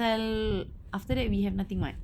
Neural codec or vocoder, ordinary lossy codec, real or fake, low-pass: none; Opus, 64 kbps; real; 19.8 kHz